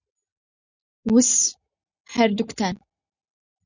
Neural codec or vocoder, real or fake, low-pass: none; real; 7.2 kHz